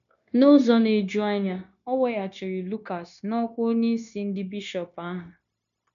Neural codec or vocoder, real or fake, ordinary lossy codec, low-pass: codec, 16 kHz, 0.9 kbps, LongCat-Audio-Codec; fake; none; 7.2 kHz